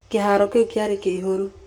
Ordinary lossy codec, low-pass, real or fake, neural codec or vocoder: none; 19.8 kHz; fake; codec, 44.1 kHz, 7.8 kbps, Pupu-Codec